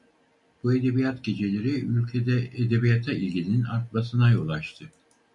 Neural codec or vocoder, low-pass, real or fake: none; 10.8 kHz; real